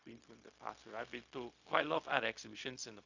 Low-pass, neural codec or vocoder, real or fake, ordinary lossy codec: 7.2 kHz; codec, 16 kHz, 0.4 kbps, LongCat-Audio-Codec; fake; Opus, 64 kbps